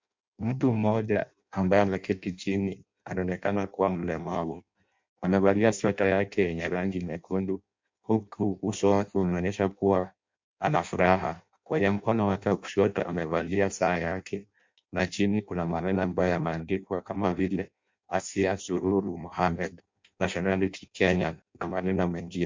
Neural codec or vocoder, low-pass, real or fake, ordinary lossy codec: codec, 16 kHz in and 24 kHz out, 0.6 kbps, FireRedTTS-2 codec; 7.2 kHz; fake; MP3, 64 kbps